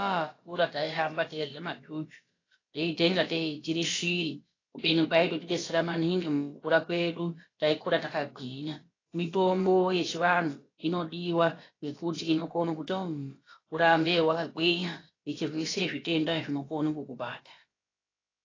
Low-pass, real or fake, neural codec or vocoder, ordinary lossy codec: 7.2 kHz; fake; codec, 16 kHz, about 1 kbps, DyCAST, with the encoder's durations; AAC, 32 kbps